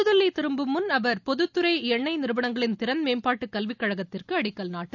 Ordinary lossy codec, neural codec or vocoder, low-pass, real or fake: none; none; 7.2 kHz; real